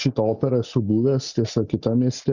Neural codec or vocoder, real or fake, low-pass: none; real; 7.2 kHz